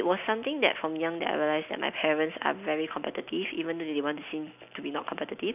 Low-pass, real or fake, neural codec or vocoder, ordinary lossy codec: 3.6 kHz; real; none; none